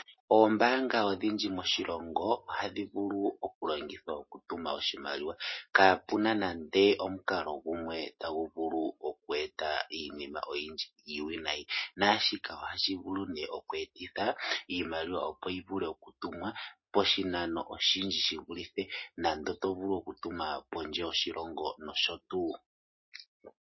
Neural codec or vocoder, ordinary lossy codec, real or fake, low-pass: none; MP3, 24 kbps; real; 7.2 kHz